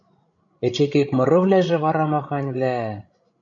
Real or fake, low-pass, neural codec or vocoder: fake; 7.2 kHz; codec, 16 kHz, 16 kbps, FreqCodec, larger model